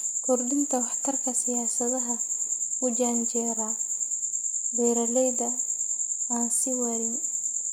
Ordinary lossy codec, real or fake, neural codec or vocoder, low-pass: none; real; none; none